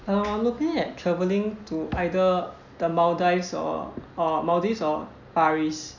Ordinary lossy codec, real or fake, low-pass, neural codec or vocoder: none; real; 7.2 kHz; none